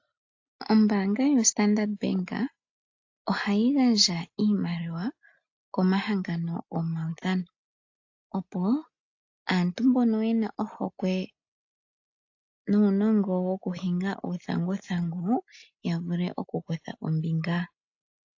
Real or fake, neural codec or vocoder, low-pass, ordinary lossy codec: real; none; 7.2 kHz; AAC, 48 kbps